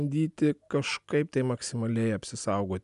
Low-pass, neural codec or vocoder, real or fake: 10.8 kHz; none; real